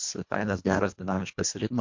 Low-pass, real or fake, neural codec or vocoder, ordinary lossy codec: 7.2 kHz; fake; codec, 24 kHz, 1.5 kbps, HILCodec; MP3, 48 kbps